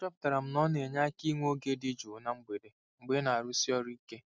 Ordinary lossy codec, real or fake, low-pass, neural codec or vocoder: none; real; none; none